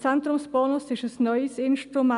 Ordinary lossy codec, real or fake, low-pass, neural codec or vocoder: none; real; 10.8 kHz; none